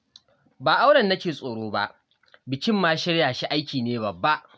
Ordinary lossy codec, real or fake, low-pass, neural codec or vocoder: none; real; none; none